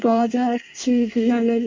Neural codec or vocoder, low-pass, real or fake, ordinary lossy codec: codec, 24 kHz, 1 kbps, SNAC; 7.2 kHz; fake; MP3, 48 kbps